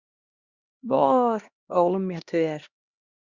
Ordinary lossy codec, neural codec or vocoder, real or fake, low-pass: Opus, 64 kbps; codec, 16 kHz, 1 kbps, X-Codec, HuBERT features, trained on LibriSpeech; fake; 7.2 kHz